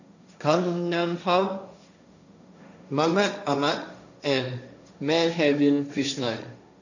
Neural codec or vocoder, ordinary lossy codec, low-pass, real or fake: codec, 16 kHz, 1.1 kbps, Voila-Tokenizer; none; 7.2 kHz; fake